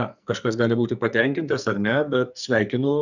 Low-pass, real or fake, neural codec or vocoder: 7.2 kHz; fake; codec, 44.1 kHz, 2.6 kbps, SNAC